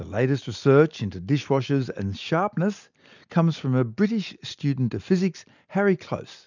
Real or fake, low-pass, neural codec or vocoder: real; 7.2 kHz; none